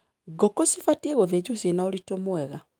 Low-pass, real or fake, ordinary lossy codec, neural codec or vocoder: 19.8 kHz; fake; Opus, 32 kbps; autoencoder, 48 kHz, 128 numbers a frame, DAC-VAE, trained on Japanese speech